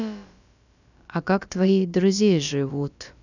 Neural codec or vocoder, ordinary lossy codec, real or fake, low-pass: codec, 16 kHz, about 1 kbps, DyCAST, with the encoder's durations; none; fake; 7.2 kHz